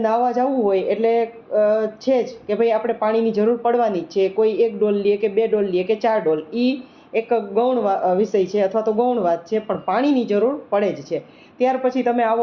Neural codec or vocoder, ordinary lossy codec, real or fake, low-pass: none; Opus, 64 kbps; real; 7.2 kHz